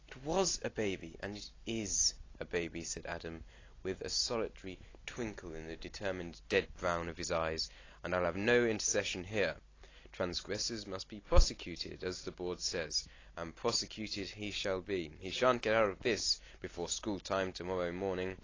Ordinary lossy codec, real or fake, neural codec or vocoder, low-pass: AAC, 32 kbps; real; none; 7.2 kHz